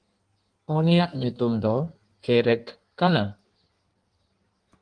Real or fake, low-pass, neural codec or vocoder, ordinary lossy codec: fake; 9.9 kHz; codec, 16 kHz in and 24 kHz out, 1.1 kbps, FireRedTTS-2 codec; Opus, 24 kbps